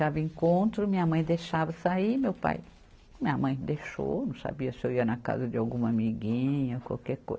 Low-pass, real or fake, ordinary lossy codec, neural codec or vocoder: none; real; none; none